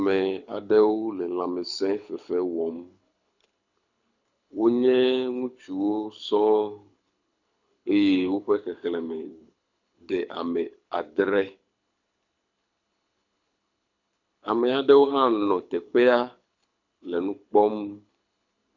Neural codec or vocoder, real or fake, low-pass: codec, 24 kHz, 6 kbps, HILCodec; fake; 7.2 kHz